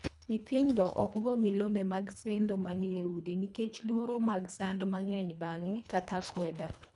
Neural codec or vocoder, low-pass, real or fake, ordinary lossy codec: codec, 24 kHz, 1.5 kbps, HILCodec; 10.8 kHz; fake; none